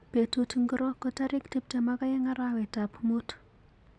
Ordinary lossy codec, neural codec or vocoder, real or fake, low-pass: none; none; real; 9.9 kHz